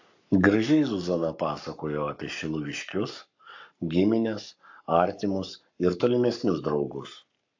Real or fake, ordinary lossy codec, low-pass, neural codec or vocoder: fake; AAC, 48 kbps; 7.2 kHz; codec, 44.1 kHz, 7.8 kbps, Pupu-Codec